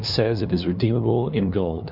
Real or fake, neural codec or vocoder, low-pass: fake; codec, 16 kHz, 2 kbps, FunCodec, trained on LibriTTS, 25 frames a second; 5.4 kHz